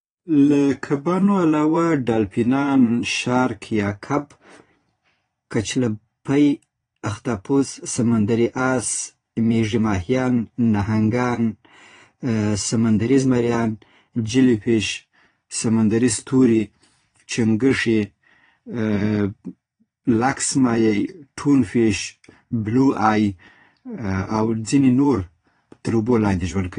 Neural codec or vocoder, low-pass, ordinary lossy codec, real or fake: vocoder, 22.05 kHz, 80 mel bands, Vocos; 9.9 kHz; AAC, 32 kbps; fake